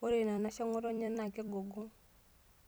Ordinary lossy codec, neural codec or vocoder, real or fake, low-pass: none; vocoder, 44.1 kHz, 128 mel bands every 256 samples, BigVGAN v2; fake; none